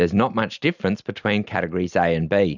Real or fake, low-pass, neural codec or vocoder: real; 7.2 kHz; none